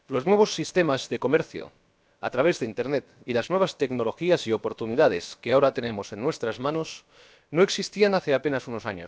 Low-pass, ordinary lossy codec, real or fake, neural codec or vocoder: none; none; fake; codec, 16 kHz, about 1 kbps, DyCAST, with the encoder's durations